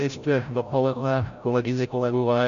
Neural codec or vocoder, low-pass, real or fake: codec, 16 kHz, 0.5 kbps, FreqCodec, larger model; 7.2 kHz; fake